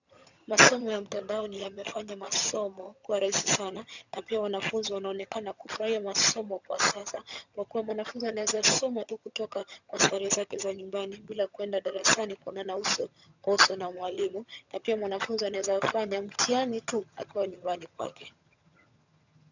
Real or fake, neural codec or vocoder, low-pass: fake; vocoder, 22.05 kHz, 80 mel bands, HiFi-GAN; 7.2 kHz